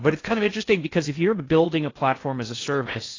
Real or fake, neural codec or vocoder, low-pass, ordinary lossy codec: fake; codec, 16 kHz in and 24 kHz out, 0.6 kbps, FocalCodec, streaming, 4096 codes; 7.2 kHz; AAC, 32 kbps